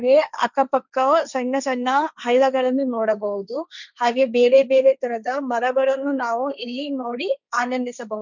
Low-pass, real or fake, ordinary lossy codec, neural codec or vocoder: 7.2 kHz; fake; none; codec, 16 kHz, 1.1 kbps, Voila-Tokenizer